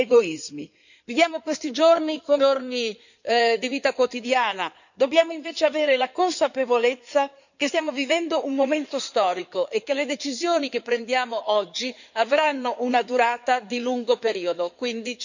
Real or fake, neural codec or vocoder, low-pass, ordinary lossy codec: fake; codec, 16 kHz in and 24 kHz out, 2.2 kbps, FireRedTTS-2 codec; 7.2 kHz; none